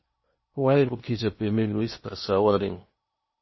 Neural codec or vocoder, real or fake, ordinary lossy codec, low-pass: codec, 16 kHz in and 24 kHz out, 0.8 kbps, FocalCodec, streaming, 65536 codes; fake; MP3, 24 kbps; 7.2 kHz